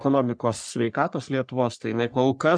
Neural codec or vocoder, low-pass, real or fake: codec, 44.1 kHz, 3.4 kbps, Pupu-Codec; 9.9 kHz; fake